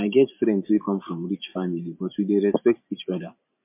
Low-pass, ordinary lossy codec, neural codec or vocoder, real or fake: 3.6 kHz; MP3, 32 kbps; vocoder, 44.1 kHz, 128 mel bands every 512 samples, BigVGAN v2; fake